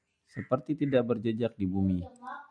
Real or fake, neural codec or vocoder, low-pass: real; none; 9.9 kHz